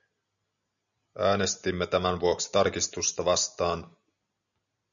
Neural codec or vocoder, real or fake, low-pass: none; real; 7.2 kHz